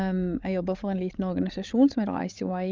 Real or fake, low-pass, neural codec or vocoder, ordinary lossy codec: fake; 7.2 kHz; codec, 16 kHz, 16 kbps, FreqCodec, larger model; Opus, 32 kbps